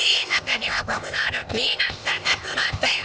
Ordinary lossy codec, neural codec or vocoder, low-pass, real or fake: none; codec, 16 kHz, 0.8 kbps, ZipCodec; none; fake